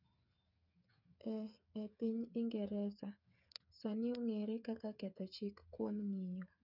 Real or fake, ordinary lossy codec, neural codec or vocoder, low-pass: fake; none; codec, 16 kHz, 8 kbps, FreqCodec, smaller model; 5.4 kHz